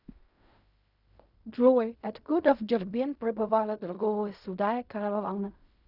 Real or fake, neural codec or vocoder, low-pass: fake; codec, 16 kHz in and 24 kHz out, 0.4 kbps, LongCat-Audio-Codec, fine tuned four codebook decoder; 5.4 kHz